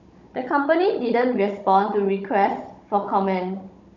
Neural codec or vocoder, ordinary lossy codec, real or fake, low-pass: codec, 16 kHz, 16 kbps, FunCodec, trained on Chinese and English, 50 frames a second; none; fake; 7.2 kHz